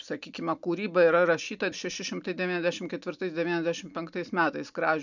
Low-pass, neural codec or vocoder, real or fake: 7.2 kHz; none; real